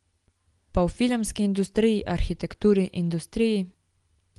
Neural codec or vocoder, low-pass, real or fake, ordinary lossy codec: none; 10.8 kHz; real; Opus, 24 kbps